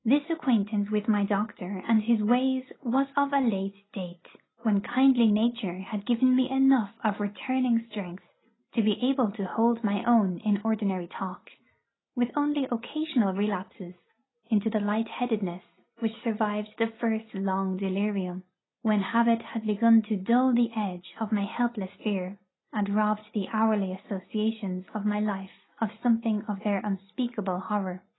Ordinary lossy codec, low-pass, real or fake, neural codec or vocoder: AAC, 16 kbps; 7.2 kHz; real; none